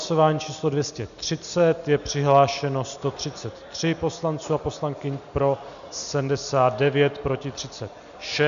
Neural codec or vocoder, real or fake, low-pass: none; real; 7.2 kHz